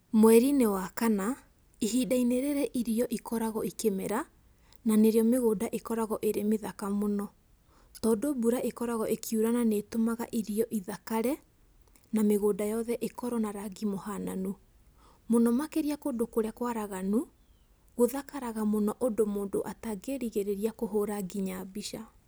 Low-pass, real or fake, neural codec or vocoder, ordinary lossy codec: none; real; none; none